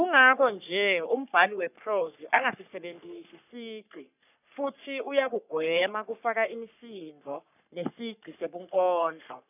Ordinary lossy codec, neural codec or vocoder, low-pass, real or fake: none; codec, 44.1 kHz, 3.4 kbps, Pupu-Codec; 3.6 kHz; fake